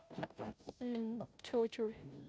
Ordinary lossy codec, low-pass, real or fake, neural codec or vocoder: none; none; fake; codec, 16 kHz, 0.5 kbps, FunCodec, trained on Chinese and English, 25 frames a second